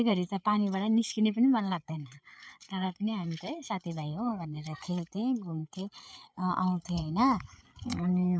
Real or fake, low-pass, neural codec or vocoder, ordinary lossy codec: fake; none; codec, 16 kHz, 8 kbps, FreqCodec, larger model; none